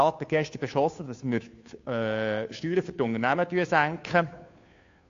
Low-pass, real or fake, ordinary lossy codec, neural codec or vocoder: 7.2 kHz; fake; AAC, 48 kbps; codec, 16 kHz, 2 kbps, FunCodec, trained on Chinese and English, 25 frames a second